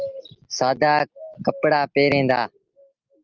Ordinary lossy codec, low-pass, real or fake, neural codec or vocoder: Opus, 24 kbps; 7.2 kHz; real; none